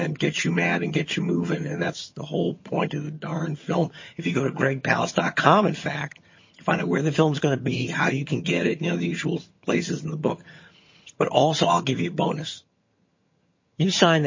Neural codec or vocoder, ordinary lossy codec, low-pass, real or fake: vocoder, 22.05 kHz, 80 mel bands, HiFi-GAN; MP3, 32 kbps; 7.2 kHz; fake